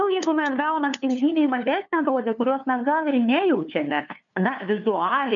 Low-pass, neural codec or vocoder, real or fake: 7.2 kHz; codec, 16 kHz, 2 kbps, FunCodec, trained on LibriTTS, 25 frames a second; fake